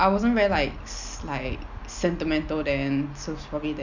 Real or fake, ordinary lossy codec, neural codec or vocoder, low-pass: real; none; none; 7.2 kHz